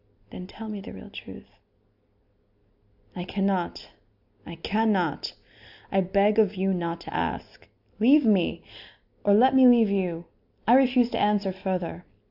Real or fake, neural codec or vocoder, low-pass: real; none; 5.4 kHz